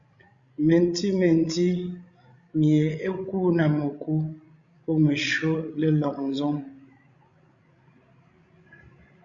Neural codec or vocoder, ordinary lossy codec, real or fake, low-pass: codec, 16 kHz, 16 kbps, FreqCodec, larger model; Opus, 64 kbps; fake; 7.2 kHz